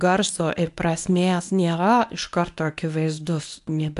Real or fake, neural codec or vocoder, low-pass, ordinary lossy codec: fake; codec, 24 kHz, 0.9 kbps, WavTokenizer, medium speech release version 1; 10.8 kHz; AAC, 64 kbps